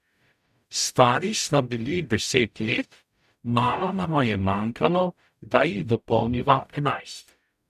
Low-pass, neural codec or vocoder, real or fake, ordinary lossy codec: 14.4 kHz; codec, 44.1 kHz, 0.9 kbps, DAC; fake; none